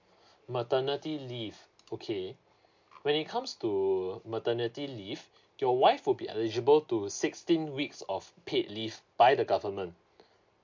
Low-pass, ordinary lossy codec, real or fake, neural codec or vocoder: 7.2 kHz; MP3, 48 kbps; real; none